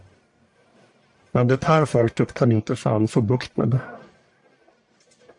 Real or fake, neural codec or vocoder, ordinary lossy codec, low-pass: fake; codec, 44.1 kHz, 1.7 kbps, Pupu-Codec; AAC, 64 kbps; 10.8 kHz